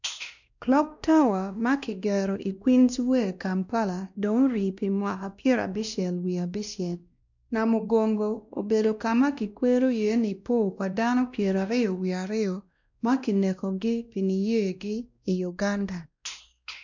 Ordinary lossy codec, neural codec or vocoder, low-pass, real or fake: none; codec, 16 kHz, 1 kbps, X-Codec, WavLM features, trained on Multilingual LibriSpeech; 7.2 kHz; fake